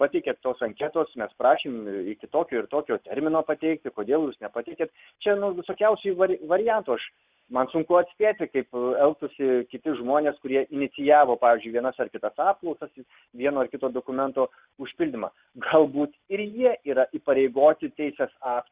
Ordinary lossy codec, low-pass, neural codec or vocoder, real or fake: Opus, 64 kbps; 3.6 kHz; none; real